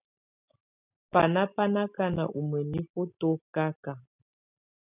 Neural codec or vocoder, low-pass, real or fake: none; 3.6 kHz; real